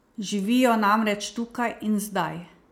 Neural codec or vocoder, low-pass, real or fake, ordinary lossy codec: none; 19.8 kHz; real; none